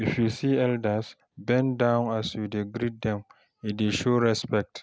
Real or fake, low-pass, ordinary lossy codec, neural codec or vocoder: real; none; none; none